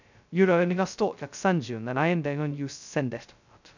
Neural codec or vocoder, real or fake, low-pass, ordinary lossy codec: codec, 16 kHz, 0.2 kbps, FocalCodec; fake; 7.2 kHz; none